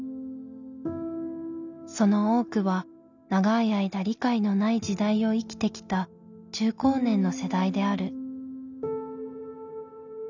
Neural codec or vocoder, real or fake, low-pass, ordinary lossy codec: none; real; 7.2 kHz; none